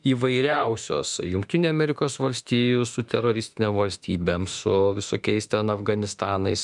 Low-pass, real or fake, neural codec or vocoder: 10.8 kHz; fake; autoencoder, 48 kHz, 32 numbers a frame, DAC-VAE, trained on Japanese speech